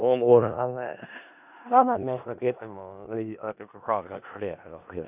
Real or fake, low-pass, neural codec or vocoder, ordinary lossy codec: fake; 3.6 kHz; codec, 16 kHz in and 24 kHz out, 0.4 kbps, LongCat-Audio-Codec, four codebook decoder; AAC, 32 kbps